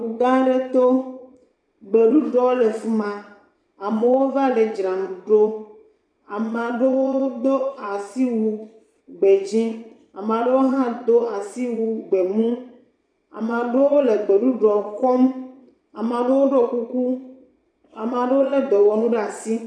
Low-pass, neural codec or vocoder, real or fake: 9.9 kHz; vocoder, 22.05 kHz, 80 mel bands, Vocos; fake